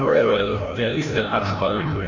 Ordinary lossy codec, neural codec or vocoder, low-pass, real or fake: MP3, 48 kbps; codec, 16 kHz, 0.5 kbps, FreqCodec, larger model; 7.2 kHz; fake